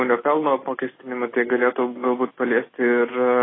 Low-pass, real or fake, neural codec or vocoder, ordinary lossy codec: 7.2 kHz; real; none; AAC, 16 kbps